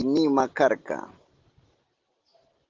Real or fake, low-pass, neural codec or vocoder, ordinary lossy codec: real; 7.2 kHz; none; Opus, 16 kbps